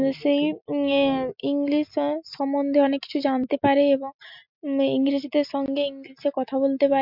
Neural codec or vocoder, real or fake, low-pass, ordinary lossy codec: none; real; 5.4 kHz; MP3, 48 kbps